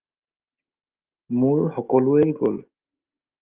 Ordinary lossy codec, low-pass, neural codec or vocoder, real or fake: Opus, 24 kbps; 3.6 kHz; none; real